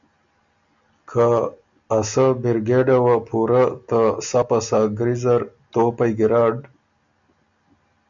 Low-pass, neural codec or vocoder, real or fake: 7.2 kHz; none; real